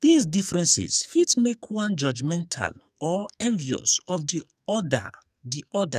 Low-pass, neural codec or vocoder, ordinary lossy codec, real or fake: 14.4 kHz; codec, 44.1 kHz, 2.6 kbps, SNAC; none; fake